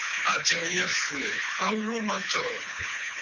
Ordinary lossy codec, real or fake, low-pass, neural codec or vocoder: MP3, 64 kbps; fake; 7.2 kHz; codec, 24 kHz, 3 kbps, HILCodec